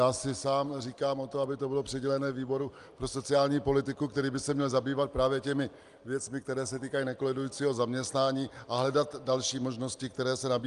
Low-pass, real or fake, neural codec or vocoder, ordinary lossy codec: 10.8 kHz; real; none; Opus, 32 kbps